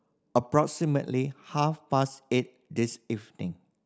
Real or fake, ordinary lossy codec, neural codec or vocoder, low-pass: real; none; none; none